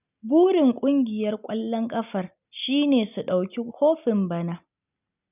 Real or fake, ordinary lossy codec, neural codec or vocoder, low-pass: real; none; none; 3.6 kHz